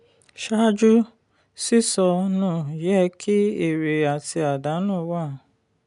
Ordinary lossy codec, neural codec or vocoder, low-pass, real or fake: none; none; 10.8 kHz; real